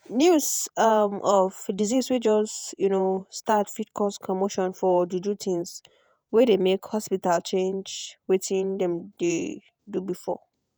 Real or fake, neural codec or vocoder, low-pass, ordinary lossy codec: fake; vocoder, 48 kHz, 128 mel bands, Vocos; none; none